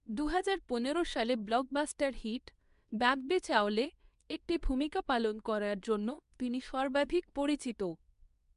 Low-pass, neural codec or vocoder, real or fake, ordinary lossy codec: 10.8 kHz; codec, 24 kHz, 0.9 kbps, WavTokenizer, medium speech release version 2; fake; MP3, 96 kbps